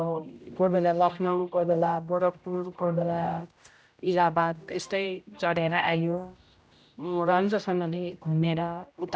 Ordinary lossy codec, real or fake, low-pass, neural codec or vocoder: none; fake; none; codec, 16 kHz, 0.5 kbps, X-Codec, HuBERT features, trained on general audio